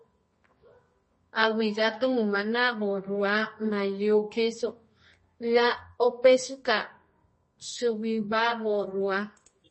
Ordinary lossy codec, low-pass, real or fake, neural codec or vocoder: MP3, 32 kbps; 10.8 kHz; fake; codec, 24 kHz, 0.9 kbps, WavTokenizer, medium music audio release